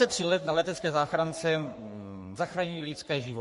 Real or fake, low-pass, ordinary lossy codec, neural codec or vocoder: fake; 14.4 kHz; MP3, 48 kbps; codec, 44.1 kHz, 3.4 kbps, Pupu-Codec